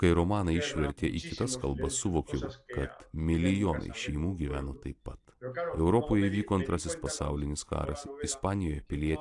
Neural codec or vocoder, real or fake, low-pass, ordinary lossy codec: none; real; 10.8 kHz; AAC, 64 kbps